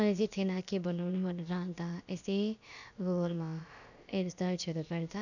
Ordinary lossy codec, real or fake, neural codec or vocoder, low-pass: none; fake; codec, 16 kHz, about 1 kbps, DyCAST, with the encoder's durations; 7.2 kHz